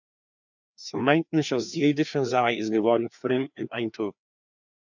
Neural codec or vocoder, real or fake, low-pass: codec, 16 kHz, 2 kbps, FreqCodec, larger model; fake; 7.2 kHz